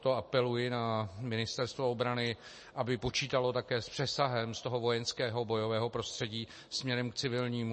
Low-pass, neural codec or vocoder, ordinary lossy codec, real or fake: 9.9 kHz; none; MP3, 32 kbps; real